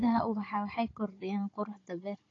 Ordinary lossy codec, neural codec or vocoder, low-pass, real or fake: AAC, 32 kbps; codec, 16 kHz, 16 kbps, FunCodec, trained on Chinese and English, 50 frames a second; 7.2 kHz; fake